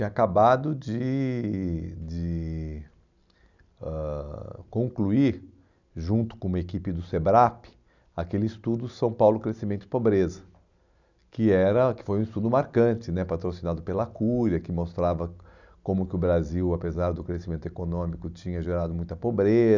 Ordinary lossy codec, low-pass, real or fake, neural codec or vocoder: none; 7.2 kHz; real; none